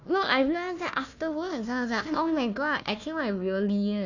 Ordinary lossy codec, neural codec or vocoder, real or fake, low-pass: none; codec, 16 kHz, 1 kbps, FunCodec, trained on Chinese and English, 50 frames a second; fake; 7.2 kHz